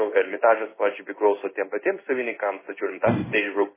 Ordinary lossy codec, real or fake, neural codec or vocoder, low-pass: MP3, 16 kbps; fake; codec, 16 kHz in and 24 kHz out, 1 kbps, XY-Tokenizer; 3.6 kHz